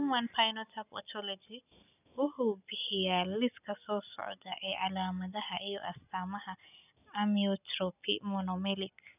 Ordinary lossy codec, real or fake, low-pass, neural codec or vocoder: none; real; 3.6 kHz; none